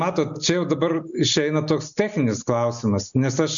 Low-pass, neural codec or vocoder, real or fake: 7.2 kHz; none; real